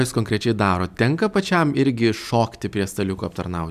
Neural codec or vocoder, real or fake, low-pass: none; real; 14.4 kHz